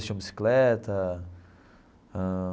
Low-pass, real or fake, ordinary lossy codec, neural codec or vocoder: none; real; none; none